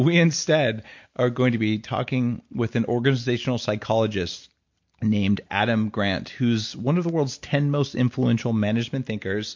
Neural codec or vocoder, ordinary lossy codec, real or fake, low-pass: none; MP3, 48 kbps; real; 7.2 kHz